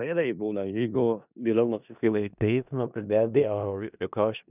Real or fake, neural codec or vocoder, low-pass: fake; codec, 16 kHz in and 24 kHz out, 0.4 kbps, LongCat-Audio-Codec, four codebook decoder; 3.6 kHz